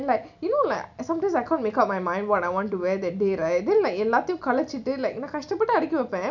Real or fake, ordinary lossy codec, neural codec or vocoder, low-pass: real; none; none; 7.2 kHz